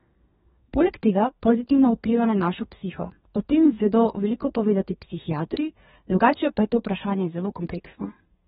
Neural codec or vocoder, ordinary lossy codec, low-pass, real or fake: codec, 32 kHz, 1.9 kbps, SNAC; AAC, 16 kbps; 14.4 kHz; fake